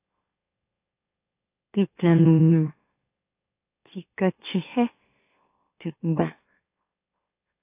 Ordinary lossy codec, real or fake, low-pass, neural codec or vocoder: AAC, 24 kbps; fake; 3.6 kHz; autoencoder, 44.1 kHz, a latent of 192 numbers a frame, MeloTTS